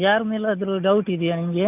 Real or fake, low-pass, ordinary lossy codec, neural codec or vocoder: real; 3.6 kHz; none; none